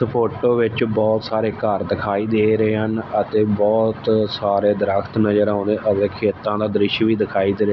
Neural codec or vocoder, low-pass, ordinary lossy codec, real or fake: none; 7.2 kHz; none; real